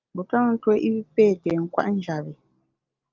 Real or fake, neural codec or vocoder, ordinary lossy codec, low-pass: real; none; Opus, 24 kbps; 7.2 kHz